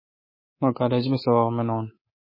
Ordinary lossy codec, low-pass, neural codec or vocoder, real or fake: MP3, 24 kbps; 5.4 kHz; codec, 24 kHz, 3.1 kbps, DualCodec; fake